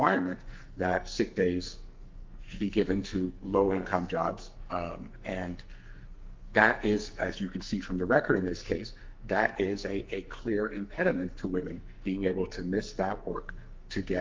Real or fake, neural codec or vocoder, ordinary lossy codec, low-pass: fake; codec, 44.1 kHz, 2.6 kbps, SNAC; Opus, 24 kbps; 7.2 kHz